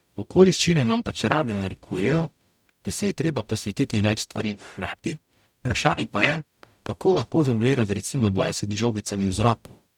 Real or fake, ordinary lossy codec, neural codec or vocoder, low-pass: fake; none; codec, 44.1 kHz, 0.9 kbps, DAC; 19.8 kHz